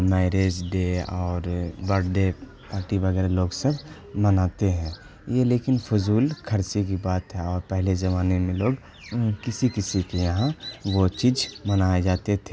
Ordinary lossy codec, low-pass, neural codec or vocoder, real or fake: none; none; none; real